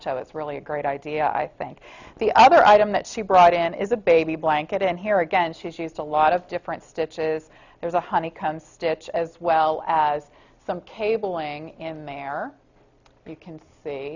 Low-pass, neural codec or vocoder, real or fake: 7.2 kHz; none; real